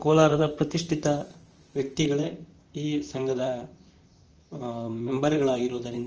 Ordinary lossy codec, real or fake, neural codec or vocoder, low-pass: Opus, 24 kbps; fake; vocoder, 44.1 kHz, 128 mel bands, Pupu-Vocoder; 7.2 kHz